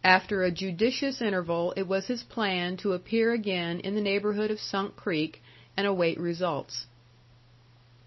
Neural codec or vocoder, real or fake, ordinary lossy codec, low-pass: none; real; MP3, 24 kbps; 7.2 kHz